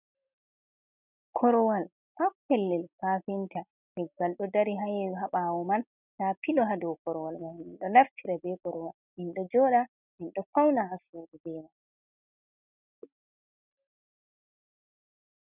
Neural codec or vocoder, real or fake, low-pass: none; real; 3.6 kHz